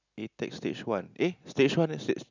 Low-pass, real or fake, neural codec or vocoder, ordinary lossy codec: 7.2 kHz; real; none; none